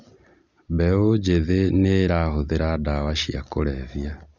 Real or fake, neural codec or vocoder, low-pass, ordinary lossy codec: real; none; 7.2 kHz; Opus, 64 kbps